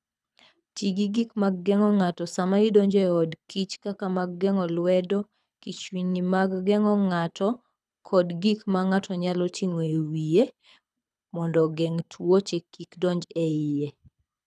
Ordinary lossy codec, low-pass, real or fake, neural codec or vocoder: none; none; fake; codec, 24 kHz, 6 kbps, HILCodec